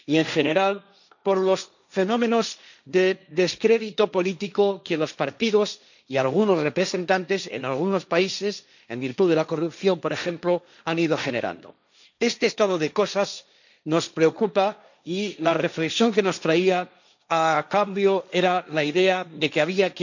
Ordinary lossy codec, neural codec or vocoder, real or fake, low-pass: none; codec, 16 kHz, 1.1 kbps, Voila-Tokenizer; fake; 7.2 kHz